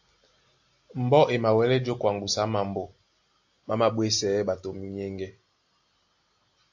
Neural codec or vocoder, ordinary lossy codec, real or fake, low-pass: none; AAC, 48 kbps; real; 7.2 kHz